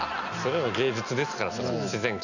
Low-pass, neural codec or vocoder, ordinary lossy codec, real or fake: 7.2 kHz; none; none; real